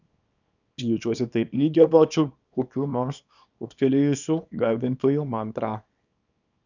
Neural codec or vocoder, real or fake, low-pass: codec, 24 kHz, 0.9 kbps, WavTokenizer, small release; fake; 7.2 kHz